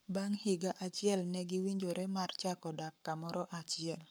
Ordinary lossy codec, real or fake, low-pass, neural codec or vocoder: none; fake; none; codec, 44.1 kHz, 7.8 kbps, Pupu-Codec